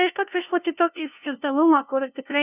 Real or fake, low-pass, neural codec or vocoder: fake; 3.6 kHz; codec, 16 kHz, 0.5 kbps, FunCodec, trained on LibriTTS, 25 frames a second